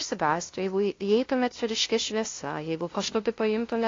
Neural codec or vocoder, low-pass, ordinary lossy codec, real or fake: codec, 16 kHz, 0.5 kbps, FunCodec, trained on LibriTTS, 25 frames a second; 7.2 kHz; AAC, 32 kbps; fake